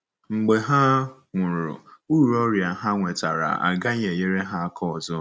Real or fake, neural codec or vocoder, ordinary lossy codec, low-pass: real; none; none; none